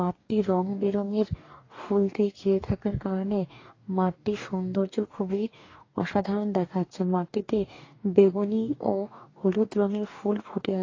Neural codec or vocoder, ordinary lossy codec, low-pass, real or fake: codec, 44.1 kHz, 2.6 kbps, DAC; AAC, 32 kbps; 7.2 kHz; fake